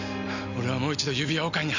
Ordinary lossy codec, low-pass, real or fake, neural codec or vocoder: none; 7.2 kHz; real; none